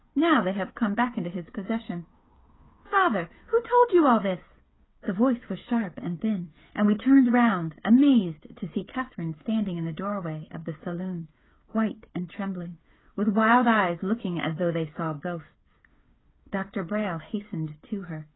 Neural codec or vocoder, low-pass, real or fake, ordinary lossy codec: codec, 16 kHz, 8 kbps, FreqCodec, smaller model; 7.2 kHz; fake; AAC, 16 kbps